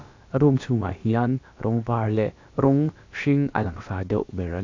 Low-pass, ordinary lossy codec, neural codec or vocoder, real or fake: 7.2 kHz; AAC, 48 kbps; codec, 16 kHz, about 1 kbps, DyCAST, with the encoder's durations; fake